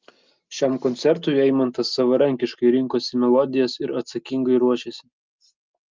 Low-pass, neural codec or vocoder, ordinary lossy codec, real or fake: 7.2 kHz; none; Opus, 24 kbps; real